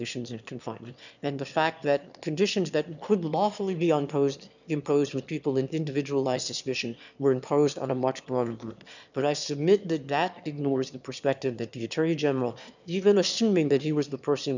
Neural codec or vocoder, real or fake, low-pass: autoencoder, 22.05 kHz, a latent of 192 numbers a frame, VITS, trained on one speaker; fake; 7.2 kHz